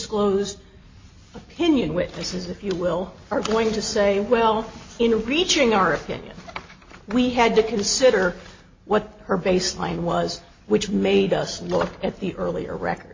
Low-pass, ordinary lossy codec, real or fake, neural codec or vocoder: 7.2 kHz; MP3, 32 kbps; real; none